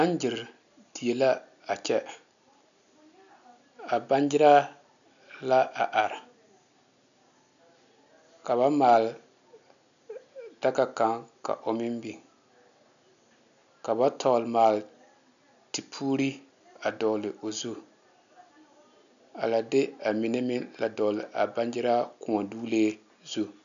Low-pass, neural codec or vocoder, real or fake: 7.2 kHz; none; real